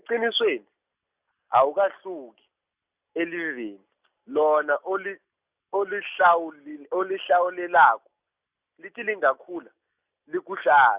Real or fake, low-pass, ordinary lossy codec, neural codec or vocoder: real; 3.6 kHz; Opus, 64 kbps; none